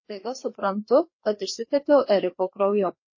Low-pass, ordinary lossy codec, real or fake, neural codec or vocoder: 7.2 kHz; MP3, 32 kbps; fake; codec, 16 kHz, 2 kbps, FreqCodec, larger model